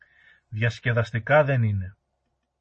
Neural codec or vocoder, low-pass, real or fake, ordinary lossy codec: none; 10.8 kHz; real; MP3, 32 kbps